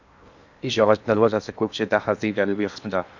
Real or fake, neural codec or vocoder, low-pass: fake; codec, 16 kHz in and 24 kHz out, 0.8 kbps, FocalCodec, streaming, 65536 codes; 7.2 kHz